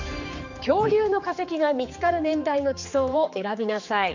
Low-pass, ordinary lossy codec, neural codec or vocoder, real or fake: 7.2 kHz; none; codec, 16 kHz, 4 kbps, X-Codec, HuBERT features, trained on general audio; fake